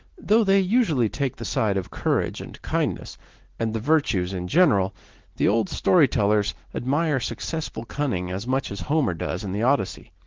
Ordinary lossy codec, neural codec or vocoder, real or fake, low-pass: Opus, 16 kbps; none; real; 7.2 kHz